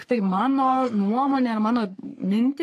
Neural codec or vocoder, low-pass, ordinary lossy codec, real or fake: codec, 44.1 kHz, 3.4 kbps, Pupu-Codec; 14.4 kHz; AAC, 64 kbps; fake